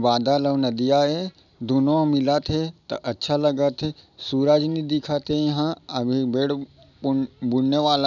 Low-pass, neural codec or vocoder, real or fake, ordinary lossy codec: 7.2 kHz; none; real; none